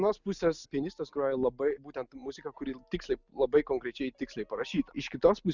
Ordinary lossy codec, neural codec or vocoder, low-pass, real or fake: Opus, 64 kbps; none; 7.2 kHz; real